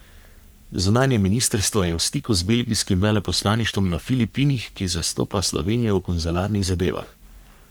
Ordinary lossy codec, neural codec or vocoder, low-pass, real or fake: none; codec, 44.1 kHz, 3.4 kbps, Pupu-Codec; none; fake